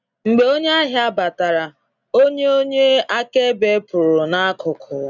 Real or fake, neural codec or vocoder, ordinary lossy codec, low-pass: real; none; none; 7.2 kHz